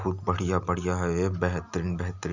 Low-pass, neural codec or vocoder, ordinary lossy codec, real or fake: 7.2 kHz; none; none; real